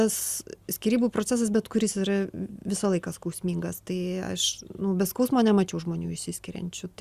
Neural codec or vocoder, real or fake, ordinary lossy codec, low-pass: none; real; Opus, 64 kbps; 14.4 kHz